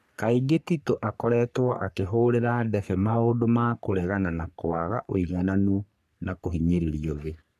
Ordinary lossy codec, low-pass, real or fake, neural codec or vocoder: none; 14.4 kHz; fake; codec, 44.1 kHz, 3.4 kbps, Pupu-Codec